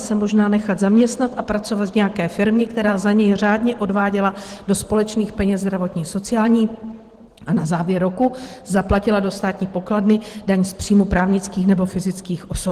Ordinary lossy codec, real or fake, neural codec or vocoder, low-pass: Opus, 16 kbps; fake; vocoder, 44.1 kHz, 128 mel bands every 512 samples, BigVGAN v2; 14.4 kHz